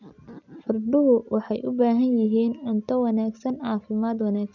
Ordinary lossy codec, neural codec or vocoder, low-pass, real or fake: none; none; 7.2 kHz; real